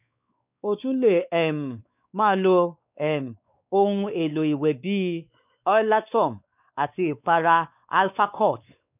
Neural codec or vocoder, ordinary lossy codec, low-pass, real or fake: codec, 16 kHz, 4 kbps, X-Codec, WavLM features, trained on Multilingual LibriSpeech; none; 3.6 kHz; fake